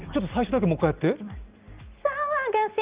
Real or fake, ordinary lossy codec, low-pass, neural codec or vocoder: real; Opus, 32 kbps; 3.6 kHz; none